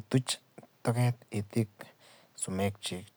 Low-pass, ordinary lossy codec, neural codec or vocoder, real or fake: none; none; none; real